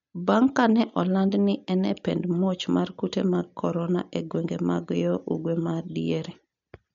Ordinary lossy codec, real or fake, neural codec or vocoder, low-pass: MP3, 48 kbps; real; none; 7.2 kHz